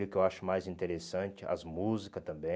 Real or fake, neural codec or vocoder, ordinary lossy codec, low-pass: real; none; none; none